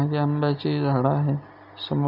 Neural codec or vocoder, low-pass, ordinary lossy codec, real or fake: none; 5.4 kHz; none; real